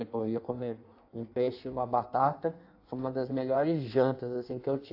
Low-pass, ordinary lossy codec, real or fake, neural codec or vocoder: 5.4 kHz; AAC, 32 kbps; fake; codec, 16 kHz in and 24 kHz out, 1.1 kbps, FireRedTTS-2 codec